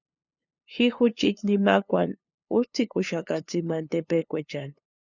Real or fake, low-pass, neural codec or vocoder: fake; 7.2 kHz; codec, 16 kHz, 2 kbps, FunCodec, trained on LibriTTS, 25 frames a second